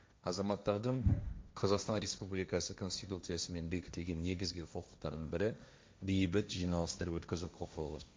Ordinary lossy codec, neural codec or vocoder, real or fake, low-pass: none; codec, 16 kHz, 1.1 kbps, Voila-Tokenizer; fake; none